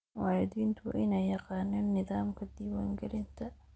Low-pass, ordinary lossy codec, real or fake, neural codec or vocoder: none; none; real; none